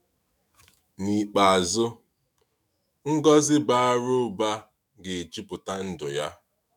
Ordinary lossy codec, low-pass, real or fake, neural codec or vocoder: none; 19.8 kHz; fake; autoencoder, 48 kHz, 128 numbers a frame, DAC-VAE, trained on Japanese speech